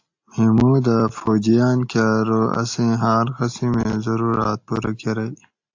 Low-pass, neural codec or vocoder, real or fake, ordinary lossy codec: 7.2 kHz; none; real; AAC, 48 kbps